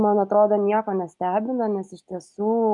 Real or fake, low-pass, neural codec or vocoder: real; 10.8 kHz; none